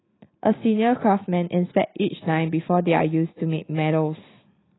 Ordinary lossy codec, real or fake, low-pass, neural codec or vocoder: AAC, 16 kbps; real; 7.2 kHz; none